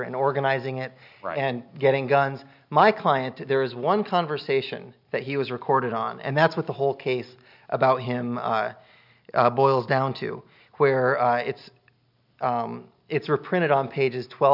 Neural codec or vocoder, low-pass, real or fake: none; 5.4 kHz; real